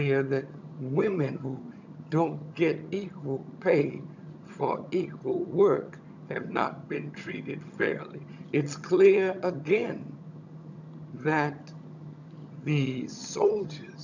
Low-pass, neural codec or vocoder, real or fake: 7.2 kHz; vocoder, 22.05 kHz, 80 mel bands, HiFi-GAN; fake